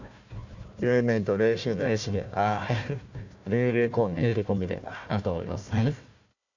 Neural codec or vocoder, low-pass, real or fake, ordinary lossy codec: codec, 16 kHz, 1 kbps, FunCodec, trained on Chinese and English, 50 frames a second; 7.2 kHz; fake; none